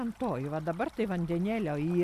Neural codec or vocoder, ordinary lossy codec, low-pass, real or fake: none; Opus, 64 kbps; 14.4 kHz; real